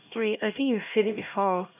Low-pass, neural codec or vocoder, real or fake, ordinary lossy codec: 3.6 kHz; codec, 16 kHz, 1 kbps, X-Codec, HuBERT features, trained on LibriSpeech; fake; none